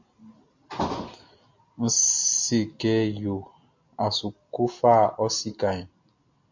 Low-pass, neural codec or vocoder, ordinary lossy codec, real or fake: 7.2 kHz; none; MP3, 48 kbps; real